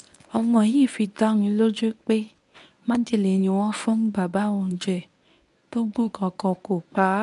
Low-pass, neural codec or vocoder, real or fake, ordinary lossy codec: 10.8 kHz; codec, 24 kHz, 0.9 kbps, WavTokenizer, medium speech release version 1; fake; none